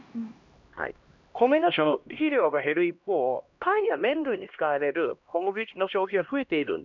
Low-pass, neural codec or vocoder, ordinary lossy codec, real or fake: 7.2 kHz; codec, 16 kHz, 1 kbps, X-Codec, HuBERT features, trained on LibriSpeech; MP3, 64 kbps; fake